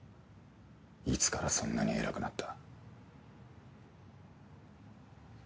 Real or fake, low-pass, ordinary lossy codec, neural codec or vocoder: real; none; none; none